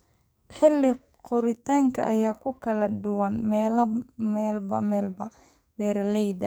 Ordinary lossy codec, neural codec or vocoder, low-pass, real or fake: none; codec, 44.1 kHz, 2.6 kbps, SNAC; none; fake